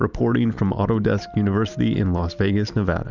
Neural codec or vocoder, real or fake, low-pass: none; real; 7.2 kHz